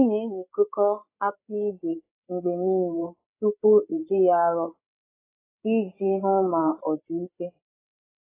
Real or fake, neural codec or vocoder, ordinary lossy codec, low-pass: fake; codec, 16 kHz, 8 kbps, FreqCodec, larger model; AAC, 24 kbps; 3.6 kHz